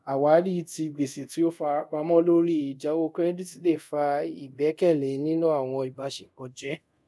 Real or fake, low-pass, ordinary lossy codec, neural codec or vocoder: fake; none; none; codec, 24 kHz, 0.5 kbps, DualCodec